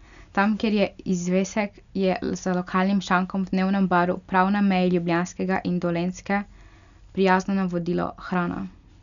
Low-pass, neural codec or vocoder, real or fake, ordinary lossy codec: 7.2 kHz; none; real; none